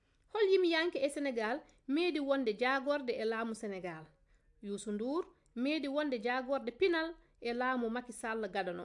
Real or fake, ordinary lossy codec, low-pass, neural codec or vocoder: real; AAC, 64 kbps; 10.8 kHz; none